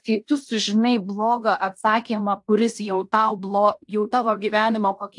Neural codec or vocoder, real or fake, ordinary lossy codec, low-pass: codec, 16 kHz in and 24 kHz out, 0.9 kbps, LongCat-Audio-Codec, fine tuned four codebook decoder; fake; AAC, 64 kbps; 10.8 kHz